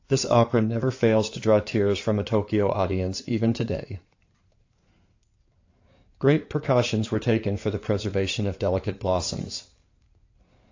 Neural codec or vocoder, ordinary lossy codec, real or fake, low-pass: codec, 16 kHz in and 24 kHz out, 2.2 kbps, FireRedTTS-2 codec; AAC, 48 kbps; fake; 7.2 kHz